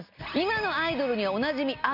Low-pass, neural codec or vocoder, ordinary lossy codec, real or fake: 5.4 kHz; none; none; real